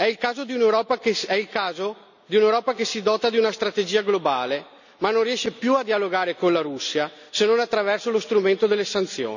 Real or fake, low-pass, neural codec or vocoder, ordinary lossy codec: real; 7.2 kHz; none; none